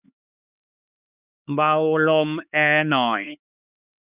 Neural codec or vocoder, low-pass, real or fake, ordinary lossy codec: codec, 16 kHz, 4 kbps, X-Codec, HuBERT features, trained on LibriSpeech; 3.6 kHz; fake; Opus, 64 kbps